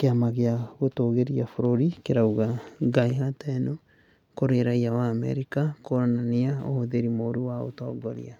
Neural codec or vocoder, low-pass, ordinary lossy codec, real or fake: none; 19.8 kHz; none; real